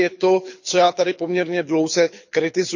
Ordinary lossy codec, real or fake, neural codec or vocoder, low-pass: none; fake; codec, 24 kHz, 6 kbps, HILCodec; 7.2 kHz